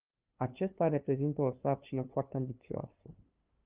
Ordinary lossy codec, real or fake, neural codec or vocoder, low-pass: Opus, 32 kbps; fake; codec, 16 kHz, 2 kbps, FunCodec, trained on LibriTTS, 25 frames a second; 3.6 kHz